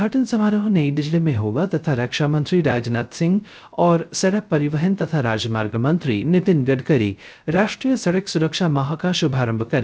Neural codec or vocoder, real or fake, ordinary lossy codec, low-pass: codec, 16 kHz, 0.3 kbps, FocalCodec; fake; none; none